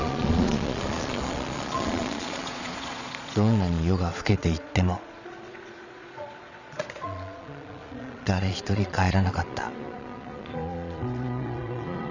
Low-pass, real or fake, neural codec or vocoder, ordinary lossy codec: 7.2 kHz; fake; vocoder, 22.05 kHz, 80 mel bands, Vocos; none